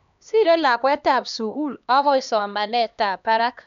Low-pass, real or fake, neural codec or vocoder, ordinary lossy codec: 7.2 kHz; fake; codec, 16 kHz, 2 kbps, X-Codec, HuBERT features, trained on LibriSpeech; none